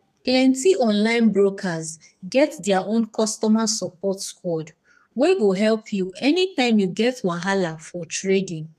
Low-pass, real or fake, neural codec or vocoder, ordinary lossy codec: 14.4 kHz; fake; codec, 32 kHz, 1.9 kbps, SNAC; none